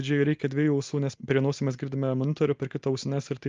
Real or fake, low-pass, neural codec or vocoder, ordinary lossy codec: real; 7.2 kHz; none; Opus, 32 kbps